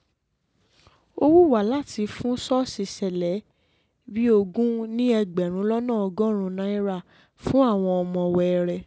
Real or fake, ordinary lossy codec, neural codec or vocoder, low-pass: real; none; none; none